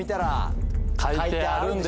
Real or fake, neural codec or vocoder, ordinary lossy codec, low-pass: real; none; none; none